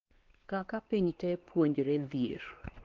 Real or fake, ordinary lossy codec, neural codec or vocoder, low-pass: fake; Opus, 16 kbps; codec, 16 kHz, 2 kbps, X-Codec, WavLM features, trained on Multilingual LibriSpeech; 7.2 kHz